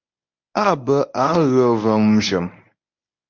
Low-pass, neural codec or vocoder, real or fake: 7.2 kHz; codec, 24 kHz, 0.9 kbps, WavTokenizer, medium speech release version 1; fake